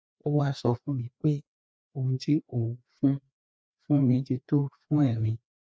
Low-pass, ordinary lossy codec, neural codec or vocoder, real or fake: none; none; codec, 16 kHz, 2 kbps, FreqCodec, larger model; fake